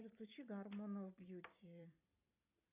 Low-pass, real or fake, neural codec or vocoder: 3.6 kHz; fake; vocoder, 44.1 kHz, 128 mel bands every 256 samples, BigVGAN v2